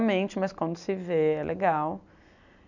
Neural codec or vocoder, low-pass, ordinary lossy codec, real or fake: none; 7.2 kHz; none; real